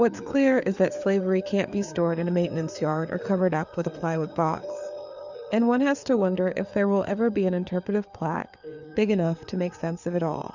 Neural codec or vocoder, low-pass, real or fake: codec, 16 kHz, 4 kbps, FreqCodec, larger model; 7.2 kHz; fake